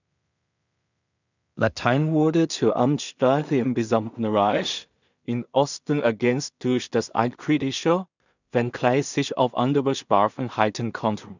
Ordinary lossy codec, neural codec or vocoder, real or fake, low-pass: none; codec, 16 kHz in and 24 kHz out, 0.4 kbps, LongCat-Audio-Codec, two codebook decoder; fake; 7.2 kHz